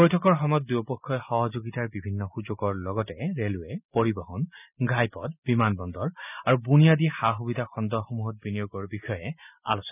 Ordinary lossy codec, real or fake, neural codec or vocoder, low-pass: none; real; none; 3.6 kHz